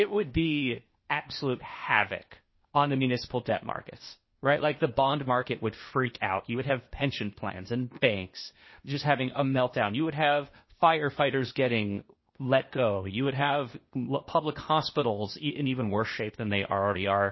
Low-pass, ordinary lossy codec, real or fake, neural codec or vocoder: 7.2 kHz; MP3, 24 kbps; fake; codec, 16 kHz, 0.8 kbps, ZipCodec